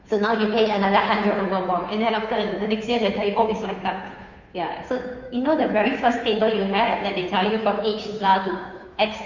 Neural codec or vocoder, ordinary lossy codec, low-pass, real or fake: codec, 16 kHz, 2 kbps, FunCodec, trained on Chinese and English, 25 frames a second; none; 7.2 kHz; fake